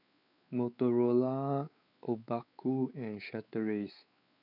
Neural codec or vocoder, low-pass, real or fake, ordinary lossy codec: codec, 16 kHz, 4 kbps, X-Codec, WavLM features, trained on Multilingual LibriSpeech; 5.4 kHz; fake; none